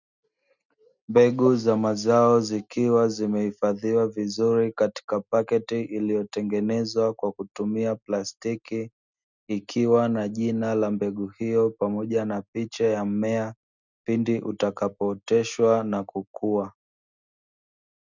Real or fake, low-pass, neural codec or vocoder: real; 7.2 kHz; none